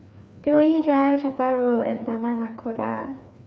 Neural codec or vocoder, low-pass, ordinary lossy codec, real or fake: codec, 16 kHz, 2 kbps, FreqCodec, larger model; none; none; fake